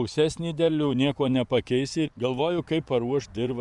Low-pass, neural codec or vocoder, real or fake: 10.8 kHz; vocoder, 24 kHz, 100 mel bands, Vocos; fake